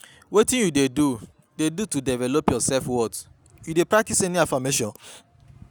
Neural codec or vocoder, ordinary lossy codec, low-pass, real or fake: none; none; none; real